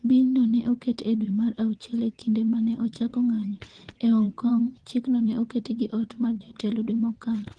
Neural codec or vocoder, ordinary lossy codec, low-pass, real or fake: vocoder, 22.05 kHz, 80 mel bands, WaveNeXt; Opus, 16 kbps; 9.9 kHz; fake